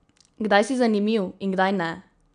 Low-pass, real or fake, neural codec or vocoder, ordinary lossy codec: 9.9 kHz; real; none; none